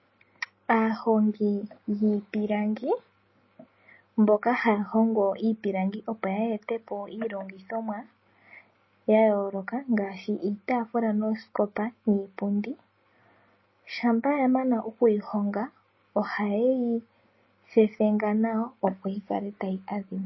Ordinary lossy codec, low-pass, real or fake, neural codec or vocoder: MP3, 24 kbps; 7.2 kHz; real; none